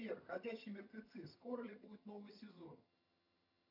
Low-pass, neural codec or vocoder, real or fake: 5.4 kHz; vocoder, 22.05 kHz, 80 mel bands, HiFi-GAN; fake